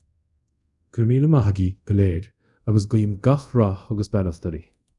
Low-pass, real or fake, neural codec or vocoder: 10.8 kHz; fake; codec, 24 kHz, 0.5 kbps, DualCodec